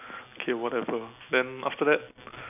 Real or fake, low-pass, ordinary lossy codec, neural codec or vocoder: real; 3.6 kHz; none; none